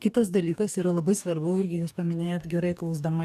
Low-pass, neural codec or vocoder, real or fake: 14.4 kHz; codec, 44.1 kHz, 2.6 kbps, DAC; fake